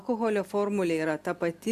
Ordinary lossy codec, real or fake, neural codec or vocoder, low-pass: Opus, 64 kbps; real; none; 14.4 kHz